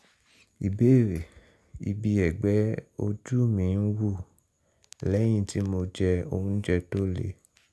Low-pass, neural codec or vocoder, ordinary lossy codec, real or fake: none; none; none; real